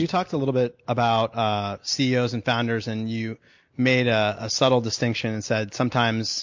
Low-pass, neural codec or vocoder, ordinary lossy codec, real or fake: 7.2 kHz; none; MP3, 48 kbps; real